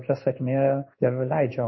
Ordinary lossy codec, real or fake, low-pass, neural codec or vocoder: MP3, 24 kbps; fake; 7.2 kHz; codec, 16 kHz in and 24 kHz out, 1 kbps, XY-Tokenizer